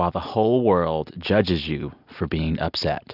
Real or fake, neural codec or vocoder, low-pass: real; none; 5.4 kHz